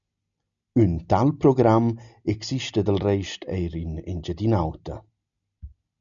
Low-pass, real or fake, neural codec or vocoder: 7.2 kHz; real; none